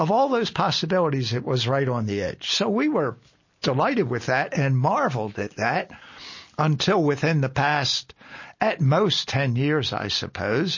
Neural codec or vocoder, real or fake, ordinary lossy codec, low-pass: none; real; MP3, 32 kbps; 7.2 kHz